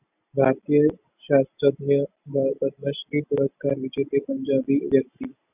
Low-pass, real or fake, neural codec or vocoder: 3.6 kHz; real; none